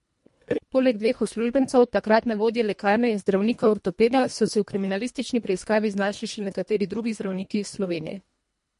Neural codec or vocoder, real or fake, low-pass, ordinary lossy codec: codec, 24 kHz, 1.5 kbps, HILCodec; fake; 10.8 kHz; MP3, 48 kbps